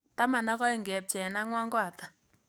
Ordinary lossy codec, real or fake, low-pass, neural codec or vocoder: none; fake; none; codec, 44.1 kHz, 7.8 kbps, DAC